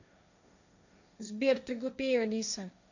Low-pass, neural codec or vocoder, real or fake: 7.2 kHz; codec, 16 kHz, 1.1 kbps, Voila-Tokenizer; fake